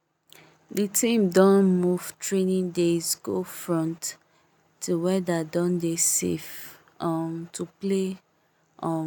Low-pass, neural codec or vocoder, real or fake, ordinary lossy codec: none; none; real; none